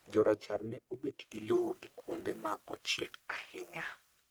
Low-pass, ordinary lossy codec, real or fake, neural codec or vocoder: none; none; fake; codec, 44.1 kHz, 1.7 kbps, Pupu-Codec